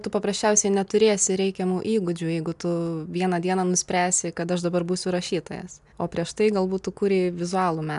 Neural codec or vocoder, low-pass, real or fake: none; 10.8 kHz; real